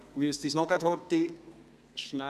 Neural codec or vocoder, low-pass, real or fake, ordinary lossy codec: codec, 32 kHz, 1.9 kbps, SNAC; 14.4 kHz; fake; none